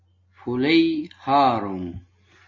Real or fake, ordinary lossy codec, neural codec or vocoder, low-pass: real; MP3, 32 kbps; none; 7.2 kHz